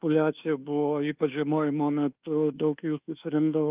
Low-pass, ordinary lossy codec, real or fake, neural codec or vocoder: 3.6 kHz; Opus, 32 kbps; fake; codec, 24 kHz, 1.2 kbps, DualCodec